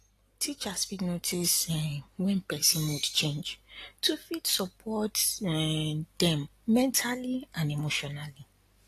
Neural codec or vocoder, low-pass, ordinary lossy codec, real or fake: none; 14.4 kHz; AAC, 48 kbps; real